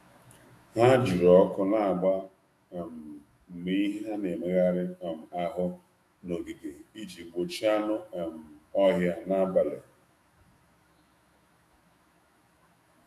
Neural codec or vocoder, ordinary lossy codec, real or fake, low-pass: autoencoder, 48 kHz, 128 numbers a frame, DAC-VAE, trained on Japanese speech; AAC, 64 kbps; fake; 14.4 kHz